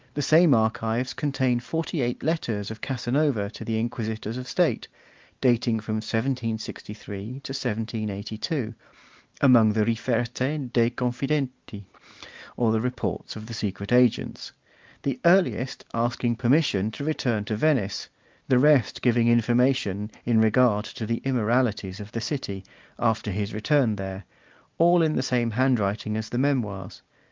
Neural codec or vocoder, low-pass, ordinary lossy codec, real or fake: none; 7.2 kHz; Opus, 24 kbps; real